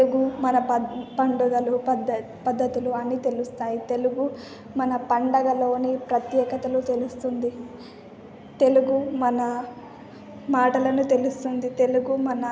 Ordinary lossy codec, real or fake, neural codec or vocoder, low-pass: none; real; none; none